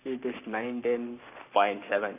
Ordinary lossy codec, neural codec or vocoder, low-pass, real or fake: none; codec, 44.1 kHz, 7.8 kbps, Pupu-Codec; 3.6 kHz; fake